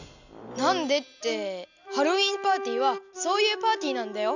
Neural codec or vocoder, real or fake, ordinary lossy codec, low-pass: none; real; none; 7.2 kHz